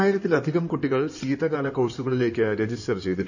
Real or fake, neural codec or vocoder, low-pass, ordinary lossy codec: fake; codec, 16 kHz in and 24 kHz out, 2.2 kbps, FireRedTTS-2 codec; 7.2 kHz; none